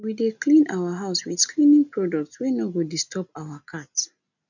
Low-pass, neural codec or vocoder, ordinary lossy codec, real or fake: 7.2 kHz; none; none; real